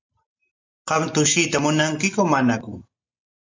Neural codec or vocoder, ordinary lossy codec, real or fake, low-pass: none; MP3, 64 kbps; real; 7.2 kHz